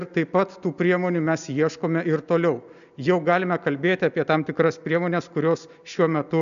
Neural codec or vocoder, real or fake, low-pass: none; real; 7.2 kHz